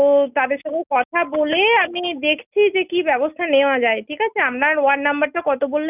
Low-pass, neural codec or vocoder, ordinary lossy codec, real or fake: 3.6 kHz; none; none; real